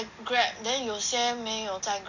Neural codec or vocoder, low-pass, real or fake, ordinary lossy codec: none; 7.2 kHz; real; none